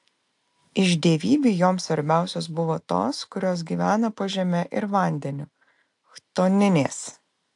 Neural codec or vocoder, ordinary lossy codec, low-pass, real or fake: none; AAC, 64 kbps; 10.8 kHz; real